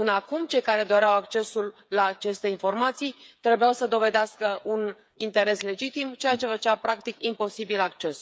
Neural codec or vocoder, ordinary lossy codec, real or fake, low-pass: codec, 16 kHz, 8 kbps, FreqCodec, smaller model; none; fake; none